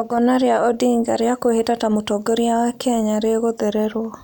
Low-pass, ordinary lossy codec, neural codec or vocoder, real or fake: 19.8 kHz; none; none; real